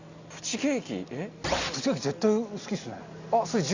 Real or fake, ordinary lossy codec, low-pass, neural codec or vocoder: real; Opus, 64 kbps; 7.2 kHz; none